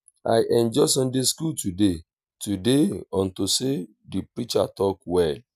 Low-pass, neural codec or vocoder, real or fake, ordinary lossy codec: 14.4 kHz; none; real; none